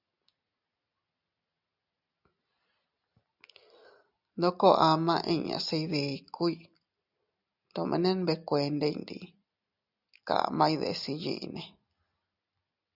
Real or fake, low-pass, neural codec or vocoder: real; 5.4 kHz; none